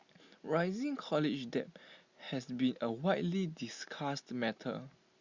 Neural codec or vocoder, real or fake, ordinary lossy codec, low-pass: none; real; Opus, 64 kbps; 7.2 kHz